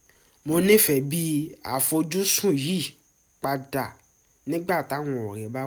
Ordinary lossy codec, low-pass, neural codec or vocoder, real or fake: none; none; none; real